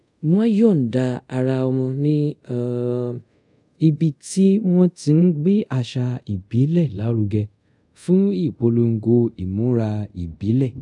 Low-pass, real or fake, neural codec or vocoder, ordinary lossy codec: 10.8 kHz; fake; codec, 24 kHz, 0.5 kbps, DualCodec; none